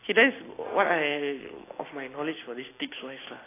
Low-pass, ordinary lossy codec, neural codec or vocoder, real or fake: 3.6 kHz; AAC, 16 kbps; none; real